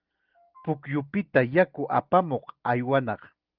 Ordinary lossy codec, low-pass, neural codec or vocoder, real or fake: Opus, 32 kbps; 5.4 kHz; none; real